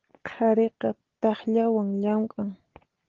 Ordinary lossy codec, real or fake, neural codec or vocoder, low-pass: Opus, 16 kbps; real; none; 7.2 kHz